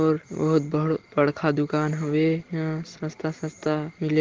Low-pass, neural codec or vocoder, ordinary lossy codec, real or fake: 7.2 kHz; none; Opus, 16 kbps; real